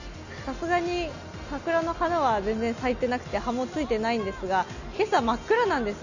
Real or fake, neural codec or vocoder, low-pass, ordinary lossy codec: real; none; 7.2 kHz; none